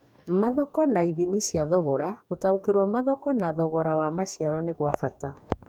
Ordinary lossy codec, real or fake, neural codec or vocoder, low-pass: none; fake; codec, 44.1 kHz, 2.6 kbps, DAC; 19.8 kHz